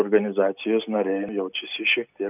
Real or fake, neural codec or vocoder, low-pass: real; none; 3.6 kHz